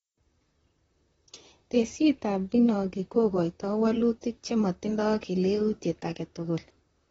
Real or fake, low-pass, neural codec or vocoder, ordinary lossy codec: fake; 19.8 kHz; vocoder, 44.1 kHz, 128 mel bands, Pupu-Vocoder; AAC, 24 kbps